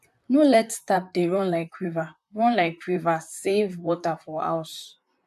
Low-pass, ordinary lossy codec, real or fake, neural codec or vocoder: 14.4 kHz; AAC, 96 kbps; fake; vocoder, 44.1 kHz, 128 mel bands, Pupu-Vocoder